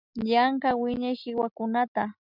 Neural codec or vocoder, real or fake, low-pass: none; real; 5.4 kHz